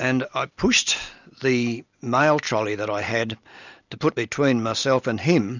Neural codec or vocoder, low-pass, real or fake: none; 7.2 kHz; real